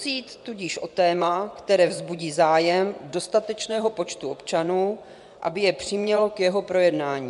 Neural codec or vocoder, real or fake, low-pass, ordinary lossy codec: vocoder, 24 kHz, 100 mel bands, Vocos; fake; 10.8 kHz; MP3, 96 kbps